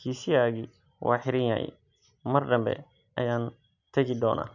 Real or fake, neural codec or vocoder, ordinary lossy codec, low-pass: real; none; none; 7.2 kHz